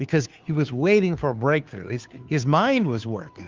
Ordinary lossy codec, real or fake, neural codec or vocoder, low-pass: Opus, 32 kbps; fake; codec, 16 kHz, 2 kbps, FunCodec, trained on Chinese and English, 25 frames a second; 7.2 kHz